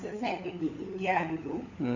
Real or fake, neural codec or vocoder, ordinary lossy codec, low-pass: fake; codec, 16 kHz, 16 kbps, FunCodec, trained on LibriTTS, 50 frames a second; none; 7.2 kHz